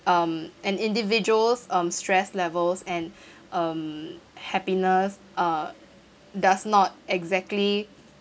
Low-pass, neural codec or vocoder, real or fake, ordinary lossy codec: none; none; real; none